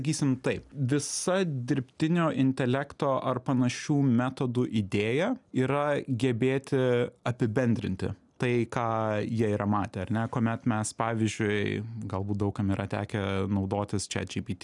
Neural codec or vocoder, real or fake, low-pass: none; real; 10.8 kHz